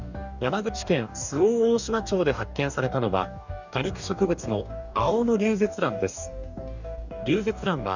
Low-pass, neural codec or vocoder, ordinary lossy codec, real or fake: 7.2 kHz; codec, 44.1 kHz, 2.6 kbps, DAC; none; fake